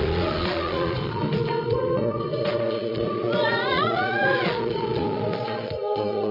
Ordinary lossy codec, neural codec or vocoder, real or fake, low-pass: none; vocoder, 22.05 kHz, 80 mel bands, Vocos; fake; 5.4 kHz